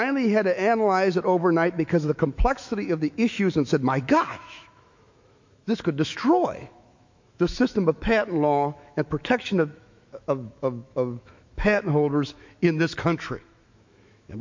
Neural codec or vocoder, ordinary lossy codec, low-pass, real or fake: autoencoder, 48 kHz, 128 numbers a frame, DAC-VAE, trained on Japanese speech; MP3, 48 kbps; 7.2 kHz; fake